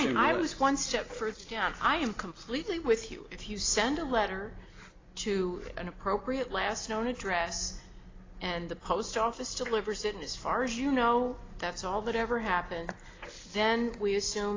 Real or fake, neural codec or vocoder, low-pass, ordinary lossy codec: real; none; 7.2 kHz; AAC, 32 kbps